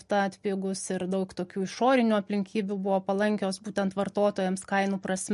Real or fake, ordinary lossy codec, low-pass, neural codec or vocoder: real; MP3, 48 kbps; 14.4 kHz; none